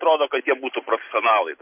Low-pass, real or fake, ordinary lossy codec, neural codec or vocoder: 3.6 kHz; real; MP3, 32 kbps; none